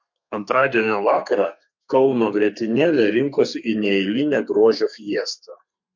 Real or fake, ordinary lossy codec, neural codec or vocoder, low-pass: fake; MP3, 48 kbps; codec, 32 kHz, 1.9 kbps, SNAC; 7.2 kHz